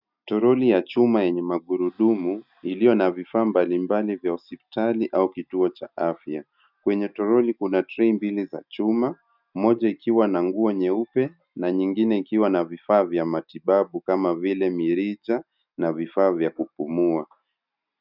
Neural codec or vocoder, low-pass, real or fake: none; 5.4 kHz; real